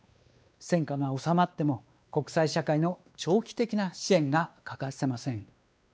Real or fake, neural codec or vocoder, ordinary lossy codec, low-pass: fake; codec, 16 kHz, 2 kbps, X-Codec, WavLM features, trained on Multilingual LibriSpeech; none; none